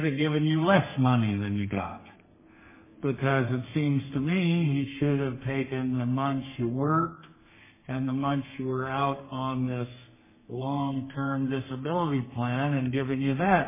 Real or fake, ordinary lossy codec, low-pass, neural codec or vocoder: fake; MP3, 16 kbps; 3.6 kHz; codec, 32 kHz, 1.9 kbps, SNAC